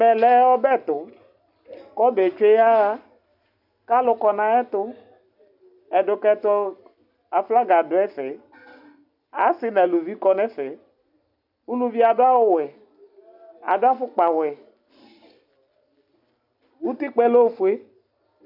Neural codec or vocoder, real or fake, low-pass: none; real; 5.4 kHz